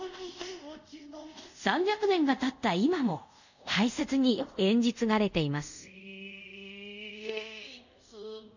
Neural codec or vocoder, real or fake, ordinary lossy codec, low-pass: codec, 24 kHz, 0.5 kbps, DualCodec; fake; none; 7.2 kHz